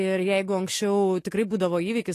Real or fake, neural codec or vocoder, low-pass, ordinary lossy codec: fake; autoencoder, 48 kHz, 128 numbers a frame, DAC-VAE, trained on Japanese speech; 14.4 kHz; AAC, 48 kbps